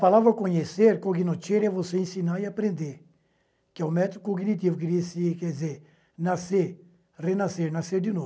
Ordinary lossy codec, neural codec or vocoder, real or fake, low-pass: none; none; real; none